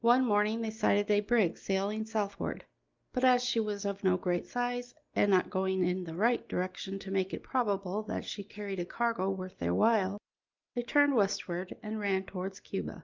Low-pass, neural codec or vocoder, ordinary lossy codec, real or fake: 7.2 kHz; none; Opus, 24 kbps; real